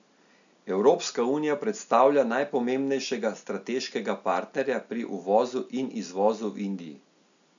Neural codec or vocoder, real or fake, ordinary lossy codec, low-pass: none; real; none; 7.2 kHz